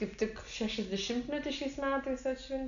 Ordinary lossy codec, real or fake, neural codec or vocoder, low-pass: MP3, 96 kbps; real; none; 7.2 kHz